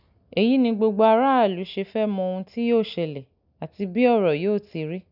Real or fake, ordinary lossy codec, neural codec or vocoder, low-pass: real; none; none; 5.4 kHz